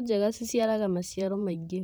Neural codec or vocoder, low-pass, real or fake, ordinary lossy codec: vocoder, 44.1 kHz, 128 mel bands, Pupu-Vocoder; none; fake; none